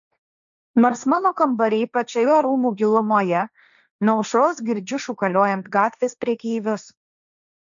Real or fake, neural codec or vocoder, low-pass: fake; codec, 16 kHz, 1.1 kbps, Voila-Tokenizer; 7.2 kHz